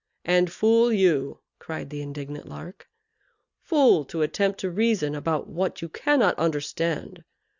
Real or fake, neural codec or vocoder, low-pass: real; none; 7.2 kHz